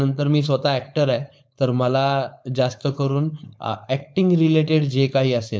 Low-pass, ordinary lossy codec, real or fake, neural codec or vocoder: none; none; fake; codec, 16 kHz, 4.8 kbps, FACodec